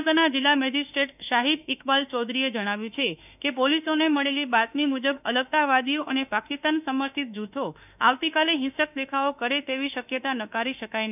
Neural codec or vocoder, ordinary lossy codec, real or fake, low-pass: autoencoder, 48 kHz, 32 numbers a frame, DAC-VAE, trained on Japanese speech; none; fake; 3.6 kHz